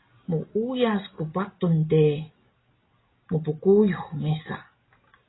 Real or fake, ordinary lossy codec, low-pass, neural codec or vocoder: real; AAC, 16 kbps; 7.2 kHz; none